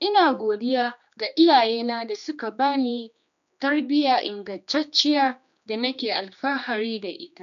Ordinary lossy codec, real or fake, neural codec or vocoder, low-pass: none; fake; codec, 16 kHz, 2 kbps, X-Codec, HuBERT features, trained on general audio; 7.2 kHz